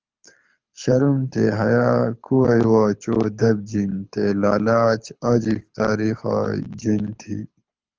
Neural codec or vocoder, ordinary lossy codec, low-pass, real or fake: codec, 24 kHz, 6 kbps, HILCodec; Opus, 24 kbps; 7.2 kHz; fake